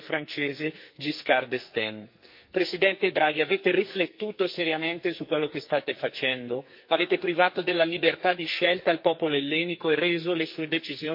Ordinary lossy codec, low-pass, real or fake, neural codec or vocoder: MP3, 32 kbps; 5.4 kHz; fake; codec, 44.1 kHz, 2.6 kbps, SNAC